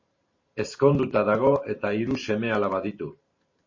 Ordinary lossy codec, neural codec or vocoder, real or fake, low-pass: MP3, 32 kbps; vocoder, 44.1 kHz, 128 mel bands every 512 samples, BigVGAN v2; fake; 7.2 kHz